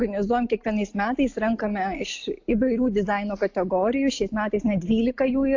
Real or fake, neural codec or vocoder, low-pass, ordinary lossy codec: real; none; 7.2 kHz; AAC, 48 kbps